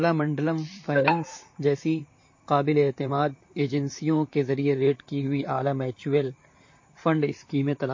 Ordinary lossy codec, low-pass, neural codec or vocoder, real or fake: MP3, 32 kbps; 7.2 kHz; vocoder, 44.1 kHz, 128 mel bands, Pupu-Vocoder; fake